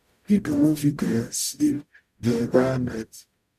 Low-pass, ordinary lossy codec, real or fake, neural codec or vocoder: 14.4 kHz; none; fake; codec, 44.1 kHz, 0.9 kbps, DAC